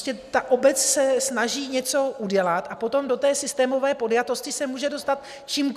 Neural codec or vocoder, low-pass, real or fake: none; 14.4 kHz; real